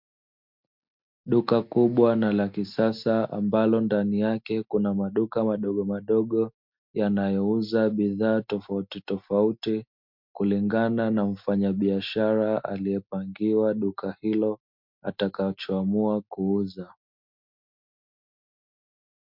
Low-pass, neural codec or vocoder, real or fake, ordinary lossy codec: 5.4 kHz; none; real; MP3, 48 kbps